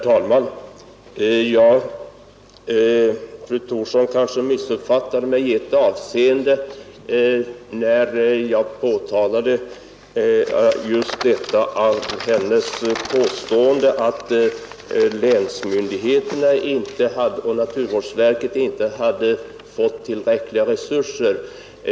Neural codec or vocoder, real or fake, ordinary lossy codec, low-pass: none; real; none; none